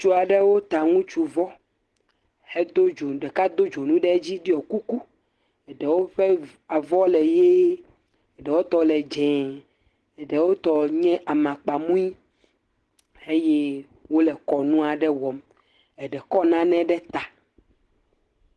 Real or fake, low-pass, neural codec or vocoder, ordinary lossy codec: real; 10.8 kHz; none; Opus, 16 kbps